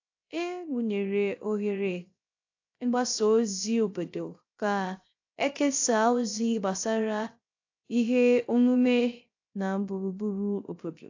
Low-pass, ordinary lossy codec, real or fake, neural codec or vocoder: 7.2 kHz; AAC, 48 kbps; fake; codec, 16 kHz, 0.3 kbps, FocalCodec